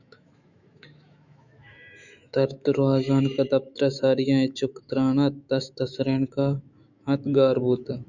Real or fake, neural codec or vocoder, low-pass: fake; autoencoder, 48 kHz, 128 numbers a frame, DAC-VAE, trained on Japanese speech; 7.2 kHz